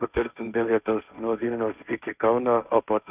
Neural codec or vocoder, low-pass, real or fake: codec, 16 kHz, 1.1 kbps, Voila-Tokenizer; 3.6 kHz; fake